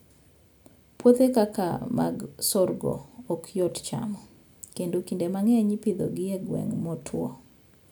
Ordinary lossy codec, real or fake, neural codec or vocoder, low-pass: none; real; none; none